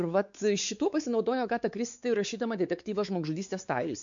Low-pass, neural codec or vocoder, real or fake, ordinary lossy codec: 7.2 kHz; codec, 16 kHz, 4 kbps, X-Codec, WavLM features, trained on Multilingual LibriSpeech; fake; MP3, 64 kbps